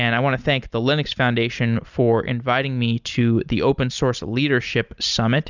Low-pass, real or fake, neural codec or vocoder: 7.2 kHz; real; none